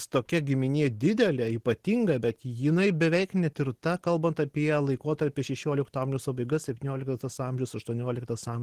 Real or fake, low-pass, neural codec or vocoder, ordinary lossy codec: real; 14.4 kHz; none; Opus, 16 kbps